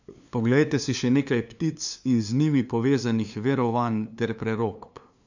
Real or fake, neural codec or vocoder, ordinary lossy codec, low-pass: fake; codec, 16 kHz, 2 kbps, FunCodec, trained on LibriTTS, 25 frames a second; none; 7.2 kHz